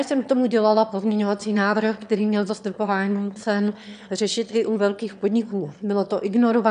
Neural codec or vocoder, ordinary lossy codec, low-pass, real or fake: autoencoder, 22.05 kHz, a latent of 192 numbers a frame, VITS, trained on one speaker; MP3, 96 kbps; 9.9 kHz; fake